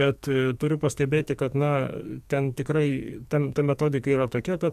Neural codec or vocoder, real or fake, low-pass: codec, 44.1 kHz, 2.6 kbps, SNAC; fake; 14.4 kHz